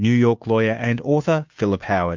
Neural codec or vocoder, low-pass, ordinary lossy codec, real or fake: codec, 16 kHz, 2 kbps, FunCodec, trained on Chinese and English, 25 frames a second; 7.2 kHz; MP3, 64 kbps; fake